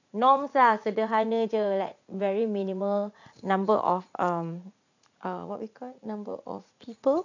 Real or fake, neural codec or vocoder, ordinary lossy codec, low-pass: fake; vocoder, 44.1 kHz, 80 mel bands, Vocos; none; 7.2 kHz